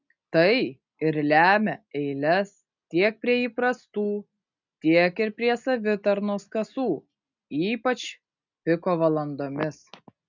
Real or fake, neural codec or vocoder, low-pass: real; none; 7.2 kHz